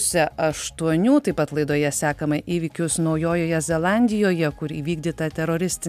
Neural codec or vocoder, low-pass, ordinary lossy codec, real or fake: none; 14.4 kHz; MP3, 96 kbps; real